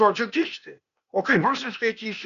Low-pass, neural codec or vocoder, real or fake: 7.2 kHz; codec, 16 kHz, 0.8 kbps, ZipCodec; fake